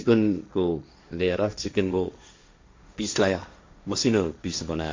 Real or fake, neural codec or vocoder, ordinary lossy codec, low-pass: fake; codec, 16 kHz, 1.1 kbps, Voila-Tokenizer; MP3, 64 kbps; 7.2 kHz